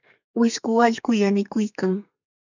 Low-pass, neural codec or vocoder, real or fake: 7.2 kHz; codec, 32 kHz, 1.9 kbps, SNAC; fake